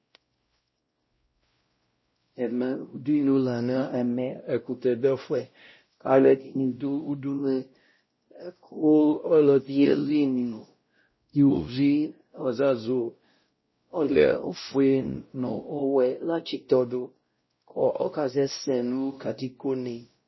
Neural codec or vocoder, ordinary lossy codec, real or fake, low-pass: codec, 16 kHz, 0.5 kbps, X-Codec, WavLM features, trained on Multilingual LibriSpeech; MP3, 24 kbps; fake; 7.2 kHz